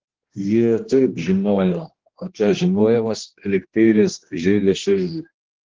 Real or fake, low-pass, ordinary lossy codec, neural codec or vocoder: fake; 7.2 kHz; Opus, 16 kbps; codec, 16 kHz, 1 kbps, X-Codec, HuBERT features, trained on general audio